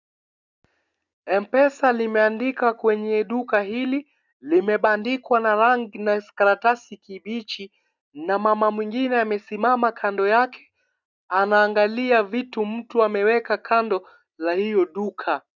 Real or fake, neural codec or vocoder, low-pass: real; none; 7.2 kHz